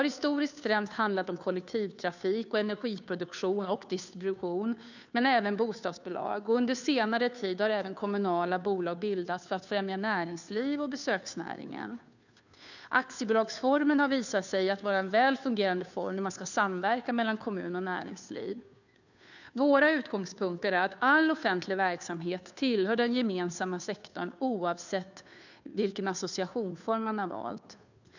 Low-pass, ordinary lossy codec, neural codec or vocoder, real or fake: 7.2 kHz; none; codec, 16 kHz, 2 kbps, FunCodec, trained on Chinese and English, 25 frames a second; fake